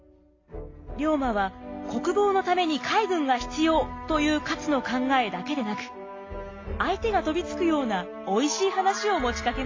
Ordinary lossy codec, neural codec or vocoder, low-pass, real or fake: AAC, 32 kbps; none; 7.2 kHz; real